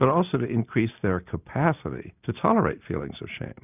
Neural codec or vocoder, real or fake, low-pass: none; real; 3.6 kHz